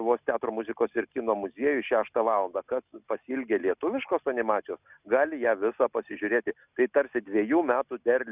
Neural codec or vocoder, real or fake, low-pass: none; real; 3.6 kHz